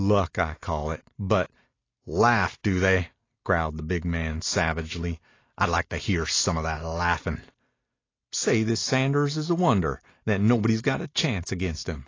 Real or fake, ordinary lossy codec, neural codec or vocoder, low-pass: real; AAC, 32 kbps; none; 7.2 kHz